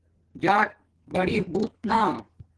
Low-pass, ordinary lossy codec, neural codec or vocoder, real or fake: 10.8 kHz; Opus, 16 kbps; codec, 44.1 kHz, 2.6 kbps, SNAC; fake